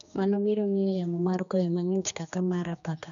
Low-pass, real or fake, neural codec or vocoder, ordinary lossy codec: 7.2 kHz; fake; codec, 16 kHz, 2 kbps, X-Codec, HuBERT features, trained on general audio; none